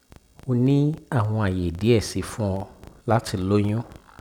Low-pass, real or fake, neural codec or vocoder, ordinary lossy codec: 19.8 kHz; real; none; none